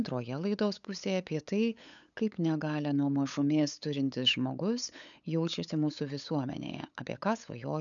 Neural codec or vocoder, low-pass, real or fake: codec, 16 kHz, 8 kbps, FunCodec, trained on LibriTTS, 25 frames a second; 7.2 kHz; fake